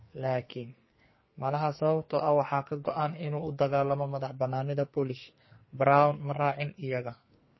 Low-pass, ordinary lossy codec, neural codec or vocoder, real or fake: 7.2 kHz; MP3, 24 kbps; codec, 44.1 kHz, 2.6 kbps, SNAC; fake